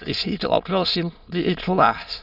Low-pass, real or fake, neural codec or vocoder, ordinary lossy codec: 5.4 kHz; fake; autoencoder, 22.05 kHz, a latent of 192 numbers a frame, VITS, trained on many speakers; none